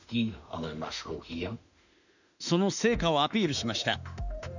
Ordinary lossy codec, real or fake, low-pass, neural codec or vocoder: none; fake; 7.2 kHz; autoencoder, 48 kHz, 32 numbers a frame, DAC-VAE, trained on Japanese speech